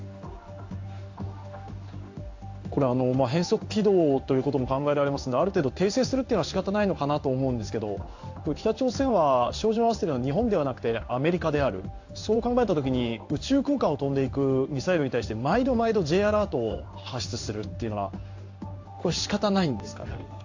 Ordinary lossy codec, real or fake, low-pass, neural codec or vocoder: AAC, 48 kbps; fake; 7.2 kHz; codec, 16 kHz in and 24 kHz out, 1 kbps, XY-Tokenizer